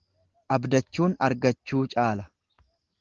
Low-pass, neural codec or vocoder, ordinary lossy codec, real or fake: 7.2 kHz; none; Opus, 16 kbps; real